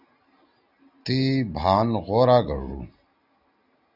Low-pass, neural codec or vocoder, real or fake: 5.4 kHz; none; real